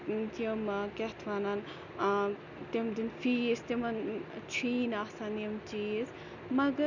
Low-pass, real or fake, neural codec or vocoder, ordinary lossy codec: 7.2 kHz; real; none; none